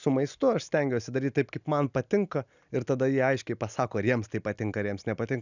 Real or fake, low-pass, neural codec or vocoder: real; 7.2 kHz; none